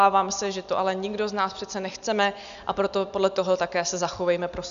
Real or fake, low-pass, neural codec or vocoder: real; 7.2 kHz; none